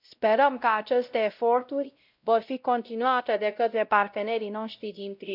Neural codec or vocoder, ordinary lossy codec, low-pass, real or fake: codec, 16 kHz, 0.5 kbps, X-Codec, WavLM features, trained on Multilingual LibriSpeech; none; 5.4 kHz; fake